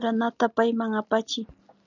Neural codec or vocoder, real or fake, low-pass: none; real; 7.2 kHz